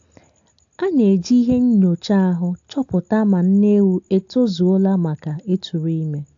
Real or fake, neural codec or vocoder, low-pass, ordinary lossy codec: real; none; 7.2 kHz; AAC, 64 kbps